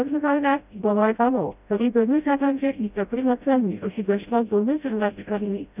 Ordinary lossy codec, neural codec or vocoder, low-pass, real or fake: none; codec, 16 kHz, 0.5 kbps, FreqCodec, smaller model; 3.6 kHz; fake